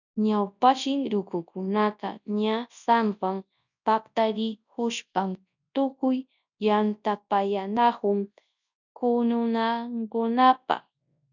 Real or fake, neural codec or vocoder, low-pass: fake; codec, 24 kHz, 0.9 kbps, WavTokenizer, large speech release; 7.2 kHz